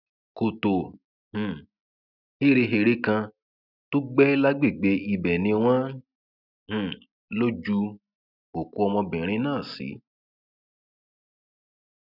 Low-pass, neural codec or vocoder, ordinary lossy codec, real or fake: 5.4 kHz; none; none; real